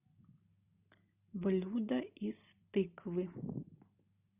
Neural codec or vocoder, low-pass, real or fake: none; 3.6 kHz; real